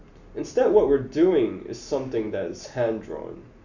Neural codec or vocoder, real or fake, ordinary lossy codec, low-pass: none; real; none; 7.2 kHz